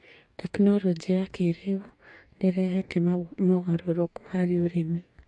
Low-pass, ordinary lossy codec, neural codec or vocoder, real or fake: 10.8 kHz; MP3, 64 kbps; codec, 44.1 kHz, 2.6 kbps, DAC; fake